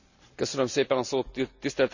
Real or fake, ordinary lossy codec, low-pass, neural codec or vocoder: real; none; 7.2 kHz; none